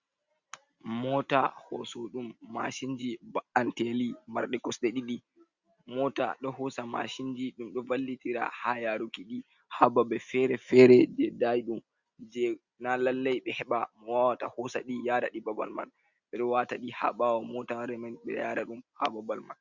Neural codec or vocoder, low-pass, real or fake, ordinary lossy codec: none; 7.2 kHz; real; Opus, 64 kbps